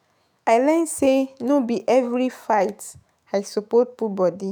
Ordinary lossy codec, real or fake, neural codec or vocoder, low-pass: none; fake; autoencoder, 48 kHz, 128 numbers a frame, DAC-VAE, trained on Japanese speech; none